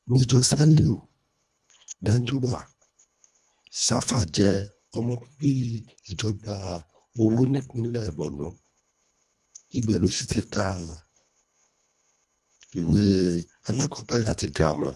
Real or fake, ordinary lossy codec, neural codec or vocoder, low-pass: fake; none; codec, 24 kHz, 1.5 kbps, HILCodec; none